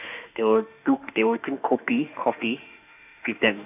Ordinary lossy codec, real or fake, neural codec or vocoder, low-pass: none; fake; autoencoder, 48 kHz, 32 numbers a frame, DAC-VAE, trained on Japanese speech; 3.6 kHz